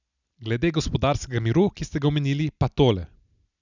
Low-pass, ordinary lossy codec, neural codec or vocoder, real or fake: 7.2 kHz; none; none; real